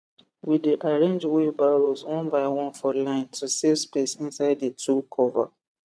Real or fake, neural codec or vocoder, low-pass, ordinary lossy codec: fake; vocoder, 22.05 kHz, 80 mel bands, Vocos; none; none